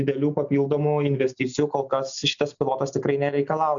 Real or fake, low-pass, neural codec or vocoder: real; 7.2 kHz; none